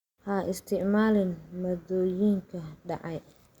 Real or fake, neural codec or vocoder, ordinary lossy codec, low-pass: real; none; Opus, 64 kbps; 19.8 kHz